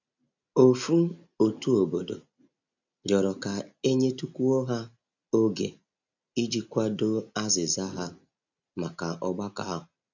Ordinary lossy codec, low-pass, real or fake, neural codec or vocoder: none; 7.2 kHz; real; none